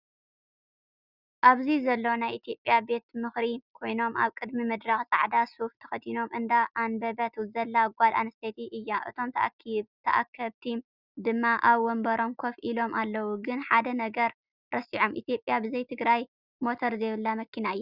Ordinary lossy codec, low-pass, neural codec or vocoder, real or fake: Opus, 64 kbps; 5.4 kHz; none; real